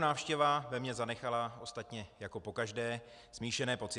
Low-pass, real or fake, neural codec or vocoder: 10.8 kHz; real; none